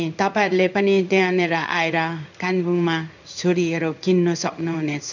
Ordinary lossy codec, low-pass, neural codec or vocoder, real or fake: none; 7.2 kHz; codec, 16 kHz in and 24 kHz out, 1 kbps, XY-Tokenizer; fake